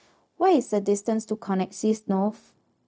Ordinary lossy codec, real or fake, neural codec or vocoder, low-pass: none; fake; codec, 16 kHz, 0.4 kbps, LongCat-Audio-Codec; none